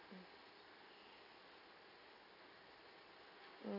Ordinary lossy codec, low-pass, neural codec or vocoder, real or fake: none; 5.4 kHz; none; real